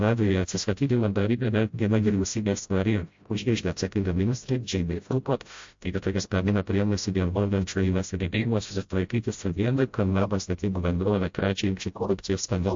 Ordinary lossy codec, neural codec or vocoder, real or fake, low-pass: MP3, 48 kbps; codec, 16 kHz, 0.5 kbps, FreqCodec, smaller model; fake; 7.2 kHz